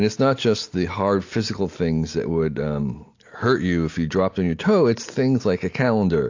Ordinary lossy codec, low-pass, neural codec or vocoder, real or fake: AAC, 48 kbps; 7.2 kHz; none; real